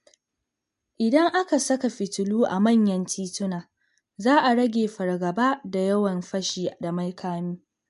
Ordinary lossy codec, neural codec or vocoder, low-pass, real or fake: MP3, 64 kbps; none; 10.8 kHz; real